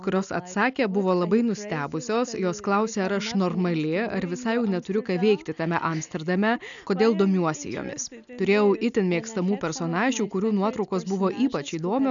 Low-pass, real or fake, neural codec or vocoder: 7.2 kHz; real; none